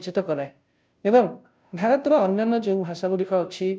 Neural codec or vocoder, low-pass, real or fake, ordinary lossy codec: codec, 16 kHz, 0.5 kbps, FunCodec, trained on Chinese and English, 25 frames a second; none; fake; none